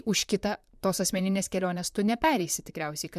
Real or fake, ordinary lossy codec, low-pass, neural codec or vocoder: fake; MP3, 96 kbps; 14.4 kHz; vocoder, 44.1 kHz, 128 mel bands, Pupu-Vocoder